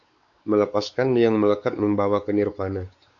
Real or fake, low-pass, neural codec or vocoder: fake; 7.2 kHz; codec, 16 kHz, 4 kbps, X-Codec, WavLM features, trained on Multilingual LibriSpeech